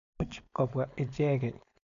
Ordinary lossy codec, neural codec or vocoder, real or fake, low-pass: none; codec, 16 kHz, 4.8 kbps, FACodec; fake; 7.2 kHz